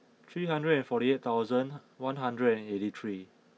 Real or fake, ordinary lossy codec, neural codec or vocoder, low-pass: real; none; none; none